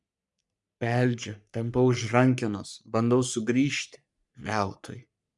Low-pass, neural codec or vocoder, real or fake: 10.8 kHz; codec, 44.1 kHz, 3.4 kbps, Pupu-Codec; fake